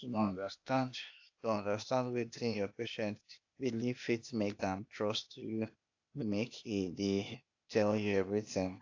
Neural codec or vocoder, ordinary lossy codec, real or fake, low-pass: codec, 16 kHz, 0.8 kbps, ZipCodec; AAC, 48 kbps; fake; 7.2 kHz